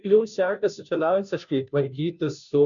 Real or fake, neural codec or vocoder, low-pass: fake; codec, 16 kHz, 0.5 kbps, FunCodec, trained on Chinese and English, 25 frames a second; 7.2 kHz